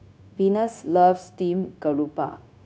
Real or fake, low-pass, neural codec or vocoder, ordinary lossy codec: fake; none; codec, 16 kHz, 0.9 kbps, LongCat-Audio-Codec; none